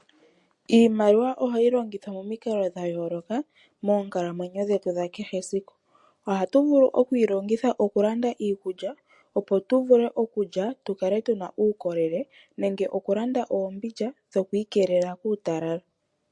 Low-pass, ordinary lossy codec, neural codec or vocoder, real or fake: 10.8 kHz; MP3, 48 kbps; none; real